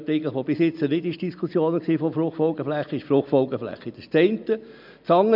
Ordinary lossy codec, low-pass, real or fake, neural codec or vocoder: none; 5.4 kHz; real; none